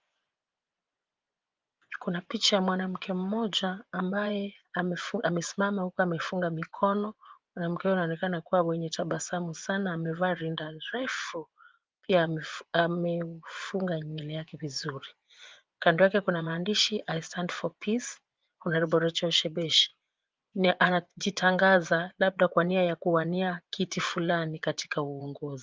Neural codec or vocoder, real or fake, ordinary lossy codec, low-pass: vocoder, 24 kHz, 100 mel bands, Vocos; fake; Opus, 32 kbps; 7.2 kHz